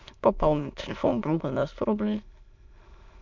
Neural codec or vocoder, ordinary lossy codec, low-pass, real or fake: autoencoder, 22.05 kHz, a latent of 192 numbers a frame, VITS, trained on many speakers; MP3, 64 kbps; 7.2 kHz; fake